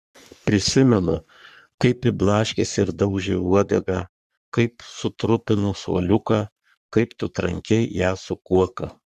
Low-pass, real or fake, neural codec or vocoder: 14.4 kHz; fake; codec, 44.1 kHz, 3.4 kbps, Pupu-Codec